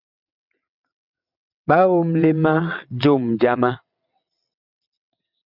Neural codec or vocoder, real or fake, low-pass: vocoder, 22.05 kHz, 80 mel bands, WaveNeXt; fake; 5.4 kHz